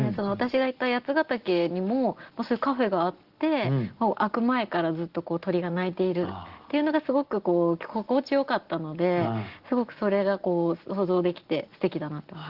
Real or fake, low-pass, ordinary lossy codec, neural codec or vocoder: real; 5.4 kHz; Opus, 16 kbps; none